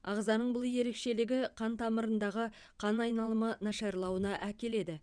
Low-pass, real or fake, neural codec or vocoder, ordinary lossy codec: 9.9 kHz; fake; vocoder, 22.05 kHz, 80 mel bands, WaveNeXt; none